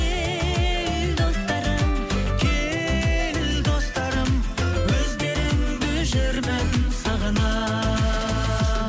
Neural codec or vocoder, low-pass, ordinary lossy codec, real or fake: none; none; none; real